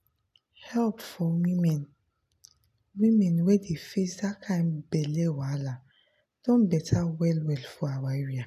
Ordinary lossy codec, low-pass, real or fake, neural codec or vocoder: none; 14.4 kHz; real; none